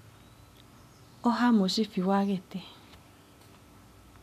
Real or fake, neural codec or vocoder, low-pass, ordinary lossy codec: real; none; 14.4 kHz; none